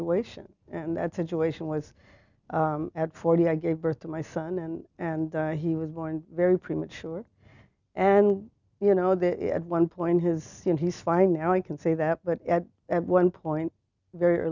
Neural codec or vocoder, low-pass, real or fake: none; 7.2 kHz; real